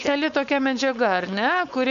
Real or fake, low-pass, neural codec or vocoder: fake; 7.2 kHz; codec, 16 kHz, 4.8 kbps, FACodec